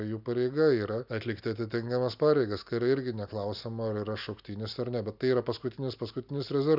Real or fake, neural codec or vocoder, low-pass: real; none; 5.4 kHz